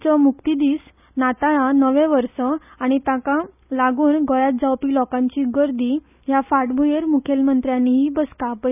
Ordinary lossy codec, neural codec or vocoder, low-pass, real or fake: none; none; 3.6 kHz; real